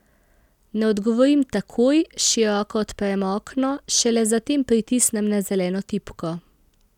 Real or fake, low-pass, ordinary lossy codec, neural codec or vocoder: real; 19.8 kHz; none; none